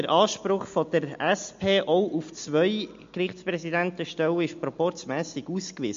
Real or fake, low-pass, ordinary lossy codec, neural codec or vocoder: real; 7.2 kHz; MP3, 48 kbps; none